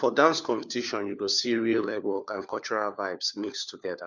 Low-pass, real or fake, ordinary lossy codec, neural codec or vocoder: 7.2 kHz; fake; none; codec, 16 kHz, 4 kbps, FunCodec, trained on LibriTTS, 50 frames a second